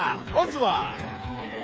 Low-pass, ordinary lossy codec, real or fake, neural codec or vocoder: none; none; fake; codec, 16 kHz, 4 kbps, FreqCodec, smaller model